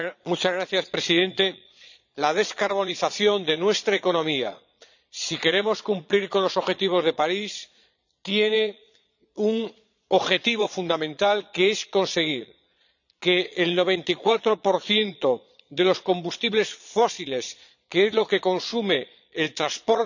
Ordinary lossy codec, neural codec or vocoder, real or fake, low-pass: none; vocoder, 22.05 kHz, 80 mel bands, Vocos; fake; 7.2 kHz